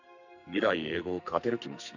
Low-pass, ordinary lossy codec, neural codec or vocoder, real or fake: 7.2 kHz; none; codec, 44.1 kHz, 2.6 kbps, SNAC; fake